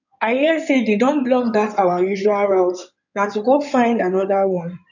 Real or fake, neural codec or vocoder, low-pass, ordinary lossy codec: fake; codec, 16 kHz in and 24 kHz out, 2.2 kbps, FireRedTTS-2 codec; 7.2 kHz; none